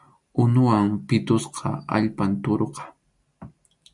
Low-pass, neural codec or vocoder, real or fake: 10.8 kHz; none; real